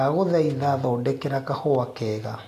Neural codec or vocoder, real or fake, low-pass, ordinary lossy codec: none; real; 14.4 kHz; AAC, 64 kbps